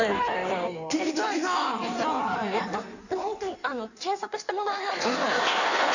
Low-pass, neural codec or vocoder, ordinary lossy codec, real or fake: 7.2 kHz; codec, 16 kHz in and 24 kHz out, 1.1 kbps, FireRedTTS-2 codec; none; fake